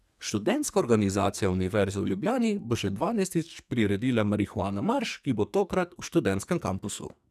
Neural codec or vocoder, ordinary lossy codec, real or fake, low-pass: codec, 44.1 kHz, 2.6 kbps, SNAC; none; fake; 14.4 kHz